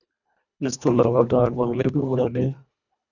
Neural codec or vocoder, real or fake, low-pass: codec, 24 kHz, 1.5 kbps, HILCodec; fake; 7.2 kHz